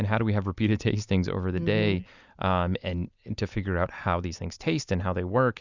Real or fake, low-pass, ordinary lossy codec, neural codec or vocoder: real; 7.2 kHz; Opus, 64 kbps; none